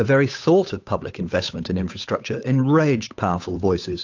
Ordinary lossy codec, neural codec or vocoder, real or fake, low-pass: AAC, 48 kbps; codec, 16 kHz, 8 kbps, FunCodec, trained on Chinese and English, 25 frames a second; fake; 7.2 kHz